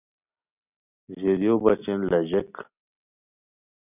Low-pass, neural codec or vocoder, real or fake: 3.6 kHz; none; real